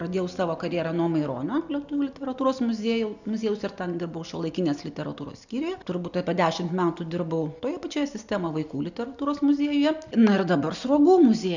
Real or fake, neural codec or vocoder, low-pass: real; none; 7.2 kHz